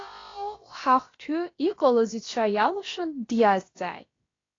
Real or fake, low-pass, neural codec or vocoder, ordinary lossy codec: fake; 7.2 kHz; codec, 16 kHz, about 1 kbps, DyCAST, with the encoder's durations; AAC, 32 kbps